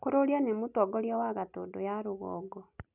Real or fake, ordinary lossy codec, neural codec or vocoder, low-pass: real; none; none; 3.6 kHz